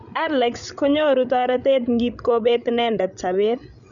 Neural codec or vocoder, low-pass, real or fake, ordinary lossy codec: codec, 16 kHz, 16 kbps, FreqCodec, larger model; 7.2 kHz; fake; none